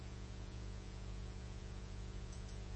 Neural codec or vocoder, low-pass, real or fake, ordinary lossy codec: vocoder, 48 kHz, 128 mel bands, Vocos; 10.8 kHz; fake; MP3, 32 kbps